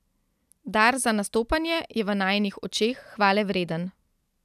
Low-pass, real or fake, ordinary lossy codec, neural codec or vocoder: 14.4 kHz; real; none; none